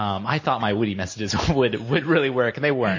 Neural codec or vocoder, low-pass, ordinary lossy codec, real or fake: none; 7.2 kHz; MP3, 32 kbps; real